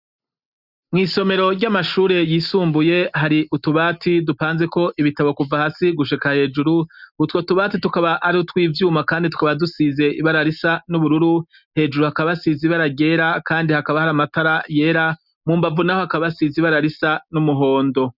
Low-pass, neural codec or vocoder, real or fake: 5.4 kHz; none; real